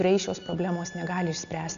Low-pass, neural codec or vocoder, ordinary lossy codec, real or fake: 7.2 kHz; none; MP3, 96 kbps; real